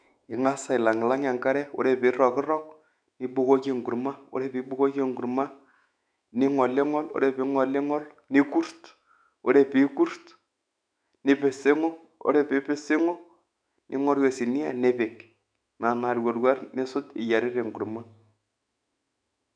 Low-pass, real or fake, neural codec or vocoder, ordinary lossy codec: 9.9 kHz; fake; autoencoder, 48 kHz, 128 numbers a frame, DAC-VAE, trained on Japanese speech; none